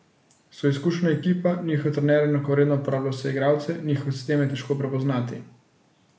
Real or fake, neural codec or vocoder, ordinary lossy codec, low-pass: real; none; none; none